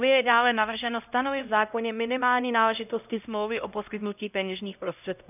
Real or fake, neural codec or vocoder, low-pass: fake; codec, 16 kHz, 0.5 kbps, X-Codec, HuBERT features, trained on LibriSpeech; 3.6 kHz